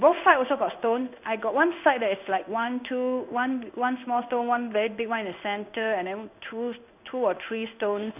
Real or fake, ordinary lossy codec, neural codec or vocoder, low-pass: fake; none; codec, 16 kHz in and 24 kHz out, 1 kbps, XY-Tokenizer; 3.6 kHz